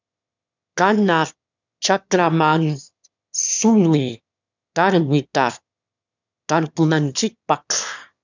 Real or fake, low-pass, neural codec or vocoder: fake; 7.2 kHz; autoencoder, 22.05 kHz, a latent of 192 numbers a frame, VITS, trained on one speaker